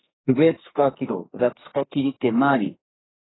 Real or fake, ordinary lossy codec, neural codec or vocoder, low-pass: fake; AAC, 16 kbps; codec, 44.1 kHz, 2.6 kbps, SNAC; 7.2 kHz